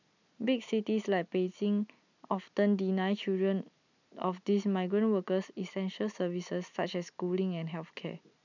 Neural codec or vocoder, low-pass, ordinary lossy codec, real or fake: none; 7.2 kHz; none; real